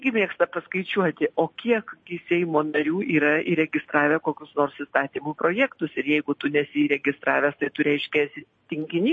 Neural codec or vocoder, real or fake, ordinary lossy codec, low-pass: none; real; MP3, 32 kbps; 9.9 kHz